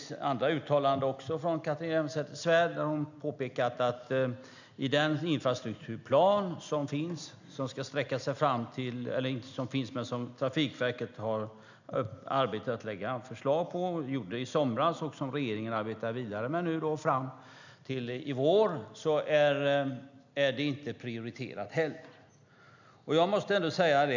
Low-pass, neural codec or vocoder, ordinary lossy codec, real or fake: 7.2 kHz; none; none; real